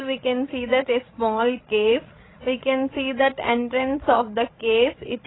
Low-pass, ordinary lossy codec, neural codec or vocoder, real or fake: 7.2 kHz; AAC, 16 kbps; codec, 16 kHz, 16 kbps, FreqCodec, larger model; fake